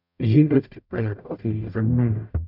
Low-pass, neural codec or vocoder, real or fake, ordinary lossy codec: 5.4 kHz; codec, 44.1 kHz, 0.9 kbps, DAC; fake; none